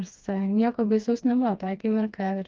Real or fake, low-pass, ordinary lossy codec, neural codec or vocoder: fake; 7.2 kHz; Opus, 24 kbps; codec, 16 kHz, 2 kbps, FreqCodec, smaller model